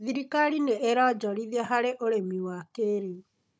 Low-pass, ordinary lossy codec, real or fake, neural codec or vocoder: none; none; fake; codec, 16 kHz, 16 kbps, FunCodec, trained on Chinese and English, 50 frames a second